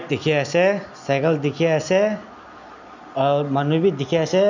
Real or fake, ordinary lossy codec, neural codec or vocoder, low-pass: real; none; none; 7.2 kHz